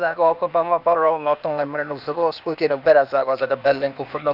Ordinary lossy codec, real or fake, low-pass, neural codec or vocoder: Opus, 64 kbps; fake; 5.4 kHz; codec, 16 kHz, 0.8 kbps, ZipCodec